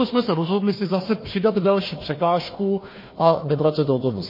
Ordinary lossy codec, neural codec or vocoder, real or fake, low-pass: MP3, 24 kbps; codec, 16 kHz, 1 kbps, FunCodec, trained on Chinese and English, 50 frames a second; fake; 5.4 kHz